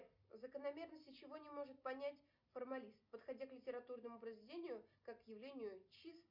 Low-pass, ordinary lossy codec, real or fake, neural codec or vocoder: 5.4 kHz; MP3, 48 kbps; real; none